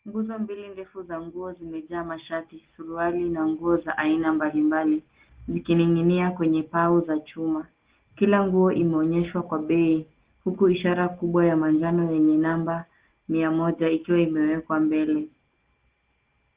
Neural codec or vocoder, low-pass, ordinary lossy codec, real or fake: none; 3.6 kHz; Opus, 16 kbps; real